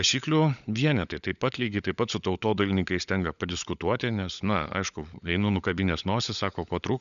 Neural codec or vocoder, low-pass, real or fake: codec, 16 kHz, 16 kbps, FunCodec, trained on LibriTTS, 50 frames a second; 7.2 kHz; fake